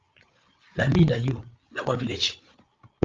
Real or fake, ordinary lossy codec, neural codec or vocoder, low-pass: fake; Opus, 32 kbps; codec, 16 kHz, 8 kbps, FreqCodec, larger model; 7.2 kHz